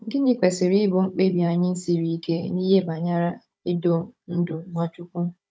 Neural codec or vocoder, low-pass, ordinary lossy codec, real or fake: codec, 16 kHz, 16 kbps, FunCodec, trained on Chinese and English, 50 frames a second; none; none; fake